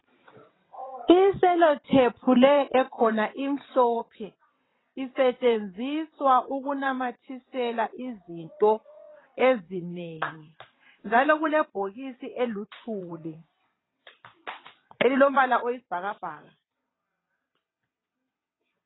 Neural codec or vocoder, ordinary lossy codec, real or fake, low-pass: vocoder, 22.05 kHz, 80 mel bands, WaveNeXt; AAC, 16 kbps; fake; 7.2 kHz